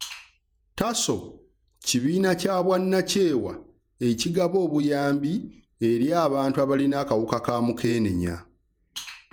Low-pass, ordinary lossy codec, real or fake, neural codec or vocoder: none; none; fake; vocoder, 48 kHz, 128 mel bands, Vocos